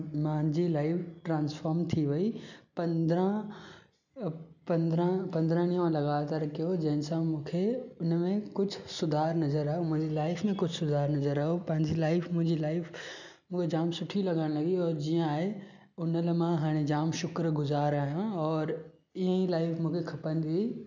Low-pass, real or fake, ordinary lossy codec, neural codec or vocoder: 7.2 kHz; real; none; none